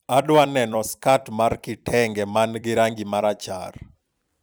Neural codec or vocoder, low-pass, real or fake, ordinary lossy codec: vocoder, 44.1 kHz, 128 mel bands every 256 samples, BigVGAN v2; none; fake; none